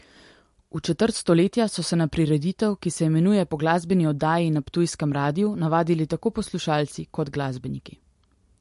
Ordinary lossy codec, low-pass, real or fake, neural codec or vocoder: MP3, 48 kbps; 14.4 kHz; real; none